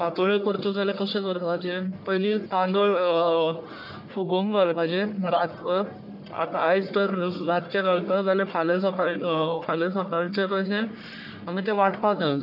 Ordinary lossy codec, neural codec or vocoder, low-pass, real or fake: none; codec, 44.1 kHz, 1.7 kbps, Pupu-Codec; 5.4 kHz; fake